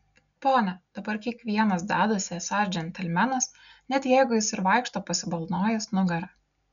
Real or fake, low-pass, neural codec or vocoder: real; 7.2 kHz; none